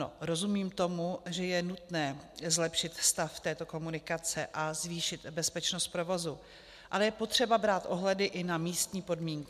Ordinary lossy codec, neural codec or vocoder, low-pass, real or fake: MP3, 96 kbps; none; 14.4 kHz; real